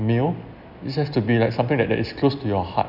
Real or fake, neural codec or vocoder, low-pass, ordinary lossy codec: real; none; 5.4 kHz; none